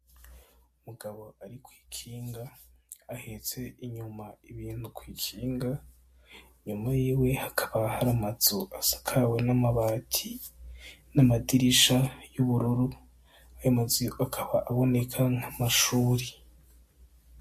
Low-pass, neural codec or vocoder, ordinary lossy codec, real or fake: 14.4 kHz; none; MP3, 64 kbps; real